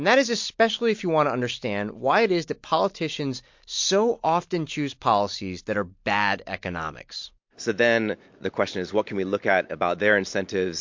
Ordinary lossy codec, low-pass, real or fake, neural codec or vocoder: MP3, 48 kbps; 7.2 kHz; real; none